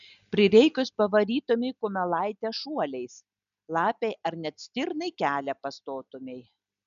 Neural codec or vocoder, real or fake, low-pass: none; real; 7.2 kHz